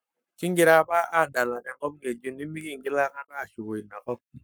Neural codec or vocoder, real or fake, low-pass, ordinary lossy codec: codec, 44.1 kHz, 7.8 kbps, Pupu-Codec; fake; none; none